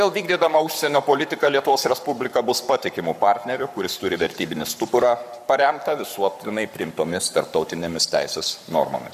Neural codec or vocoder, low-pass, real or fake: codec, 44.1 kHz, 7.8 kbps, Pupu-Codec; 14.4 kHz; fake